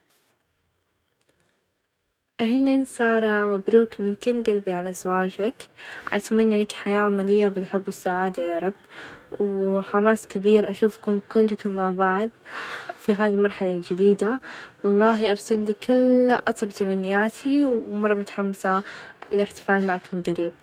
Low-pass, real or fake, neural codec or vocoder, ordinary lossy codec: 19.8 kHz; fake; codec, 44.1 kHz, 2.6 kbps, DAC; none